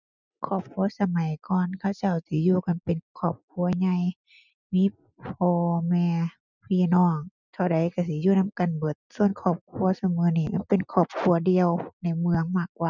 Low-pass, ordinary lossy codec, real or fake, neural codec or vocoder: 7.2 kHz; none; real; none